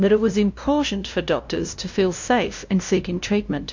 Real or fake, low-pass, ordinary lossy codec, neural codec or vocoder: fake; 7.2 kHz; MP3, 48 kbps; codec, 16 kHz, 0.5 kbps, FunCodec, trained on LibriTTS, 25 frames a second